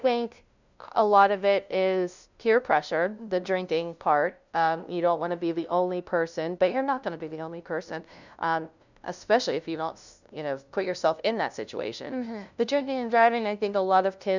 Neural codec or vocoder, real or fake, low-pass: codec, 16 kHz, 0.5 kbps, FunCodec, trained on LibriTTS, 25 frames a second; fake; 7.2 kHz